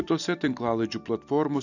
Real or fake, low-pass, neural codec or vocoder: real; 7.2 kHz; none